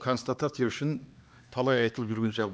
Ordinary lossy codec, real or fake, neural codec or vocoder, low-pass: none; fake; codec, 16 kHz, 2 kbps, X-Codec, HuBERT features, trained on LibriSpeech; none